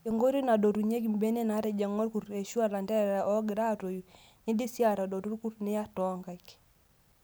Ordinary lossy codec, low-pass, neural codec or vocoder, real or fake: none; none; none; real